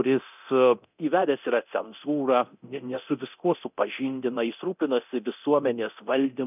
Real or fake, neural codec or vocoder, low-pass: fake; codec, 24 kHz, 0.9 kbps, DualCodec; 3.6 kHz